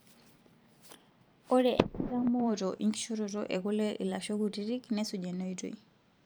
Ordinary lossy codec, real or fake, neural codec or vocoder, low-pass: none; fake; vocoder, 44.1 kHz, 128 mel bands every 512 samples, BigVGAN v2; none